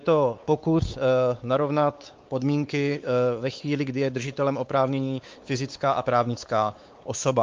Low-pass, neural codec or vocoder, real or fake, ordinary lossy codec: 7.2 kHz; codec, 16 kHz, 4 kbps, X-Codec, WavLM features, trained on Multilingual LibriSpeech; fake; Opus, 24 kbps